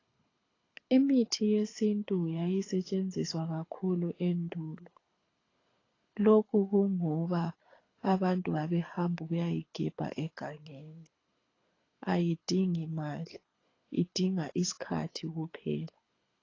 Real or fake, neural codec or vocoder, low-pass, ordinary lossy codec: fake; codec, 24 kHz, 6 kbps, HILCodec; 7.2 kHz; AAC, 32 kbps